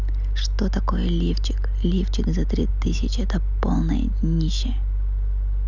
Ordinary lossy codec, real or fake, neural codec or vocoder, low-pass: none; real; none; 7.2 kHz